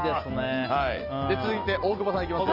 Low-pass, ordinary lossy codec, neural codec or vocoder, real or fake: 5.4 kHz; Opus, 24 kbps; none; real